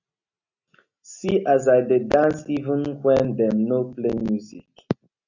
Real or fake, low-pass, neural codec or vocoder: real; 7.2 kHz; none